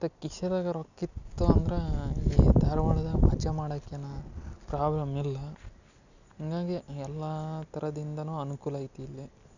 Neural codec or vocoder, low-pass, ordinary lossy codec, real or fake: none; 7.2 kHz; none; real